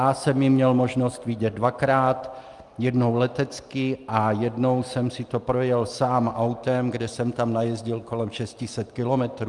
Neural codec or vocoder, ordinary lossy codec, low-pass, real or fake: none; Opus, 32 kbps; 10.8 kHz; real